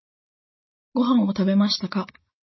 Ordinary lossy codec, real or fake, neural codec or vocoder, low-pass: MP3, 24 kbps; real; none; 7.2 kHz